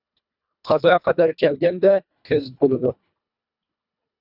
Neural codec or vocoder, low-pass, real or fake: codec, 24 kHz, 1.5 kbps, HILCodec; 5.4 kHz; fake